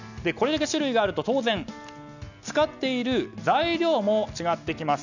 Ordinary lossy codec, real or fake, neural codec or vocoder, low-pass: none; real; none; 7.2 kHz